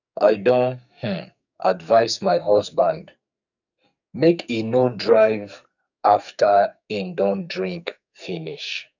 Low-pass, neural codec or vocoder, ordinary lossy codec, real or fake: 7.2 kHz; codec, 44.1 kHz, 2.6 kbps, SNAC; none; fake